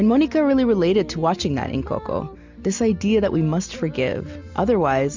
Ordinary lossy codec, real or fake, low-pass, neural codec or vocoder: MP3, 64 kbps; real; 7.2 kHz; none